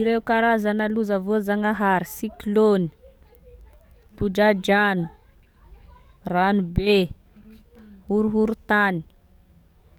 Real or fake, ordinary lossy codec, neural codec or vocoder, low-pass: fake; none; codec, 44.1 kHz, 7.8 kbps, DAC; 19.8 kHz